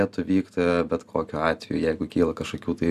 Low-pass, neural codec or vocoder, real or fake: 14.4 kHz; none; real